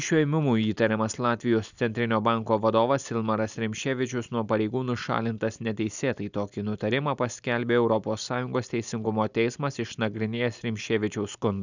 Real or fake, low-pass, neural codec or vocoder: real; 7.2 kHz; none